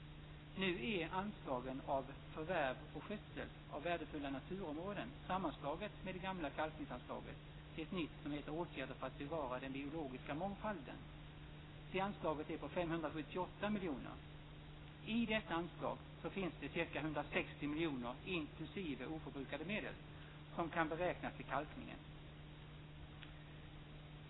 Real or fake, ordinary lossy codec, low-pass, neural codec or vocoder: real; AAC, 16 kbps; 7.2 kHz; none